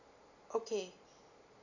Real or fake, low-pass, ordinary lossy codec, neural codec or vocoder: real; 7.2 kHz; none; none